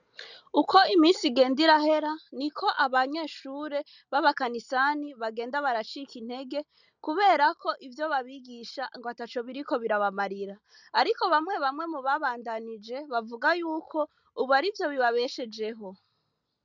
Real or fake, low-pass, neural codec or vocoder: real; 7.2 kHz; none